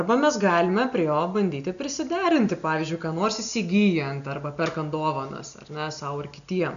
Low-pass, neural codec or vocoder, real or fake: 7.2 kHz; none; real